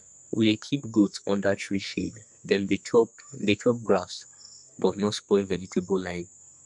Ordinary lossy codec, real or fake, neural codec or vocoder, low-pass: MP3, 96 kbps; fake; codec, 44.1 kHz, 2.6 kbps, SNAC; 10.8 kHz